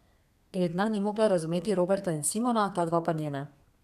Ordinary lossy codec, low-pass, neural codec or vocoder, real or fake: none; 14.4 kHz; codec, 32 kHz, 1.9 kbps, SNAC; fake